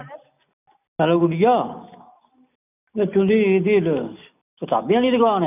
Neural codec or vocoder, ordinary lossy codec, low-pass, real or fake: none; none; 3.6 kHz; real